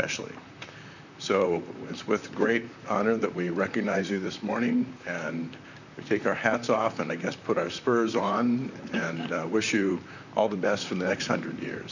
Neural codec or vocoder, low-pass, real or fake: vocoder, 44.1 kHz, 128 mel bands, Pupu-Vocoder; 7.2 kHz; fake